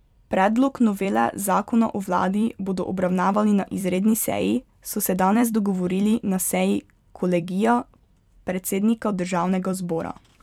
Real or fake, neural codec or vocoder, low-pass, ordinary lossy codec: fake; vocoder, 44.1 kHz, 128 mel bands every 256 samples, BigVGAN v2; 19.8 kHz; none